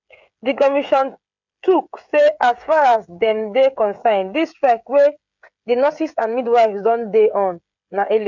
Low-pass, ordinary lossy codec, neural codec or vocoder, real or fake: 7.2 kHz; MP3, 64 kbps; codec, 16 kHz, 16 kbps, FreqCodec, smaller model; fake